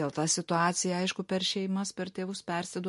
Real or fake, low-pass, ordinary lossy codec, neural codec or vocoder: real; 14.4 kHz; MP3, 48 kbps; none